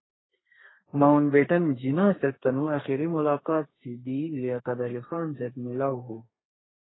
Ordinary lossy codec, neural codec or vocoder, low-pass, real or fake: AAC, 16 kbps; codec, 32 kHz, 1.9 kbps, SNAC; 7.2 kHz; fake